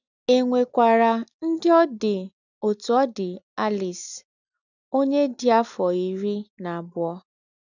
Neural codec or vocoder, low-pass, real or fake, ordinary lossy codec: none; 7.2 kHz; real; none